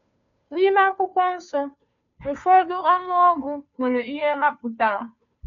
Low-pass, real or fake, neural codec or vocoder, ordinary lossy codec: 7.2 kHz; fake; codec, 16 kHz, 2 kbps, FunCodec, trained on Chinese and English, 25 frames a second; none